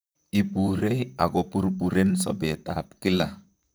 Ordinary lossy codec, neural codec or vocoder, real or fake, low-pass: none; vocoder, 44.1 kHz, 128 mel bands, Pupu-Vocoder; fake; none